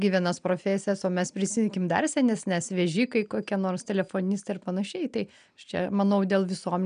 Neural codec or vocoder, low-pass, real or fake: none; 9.9 kHz; real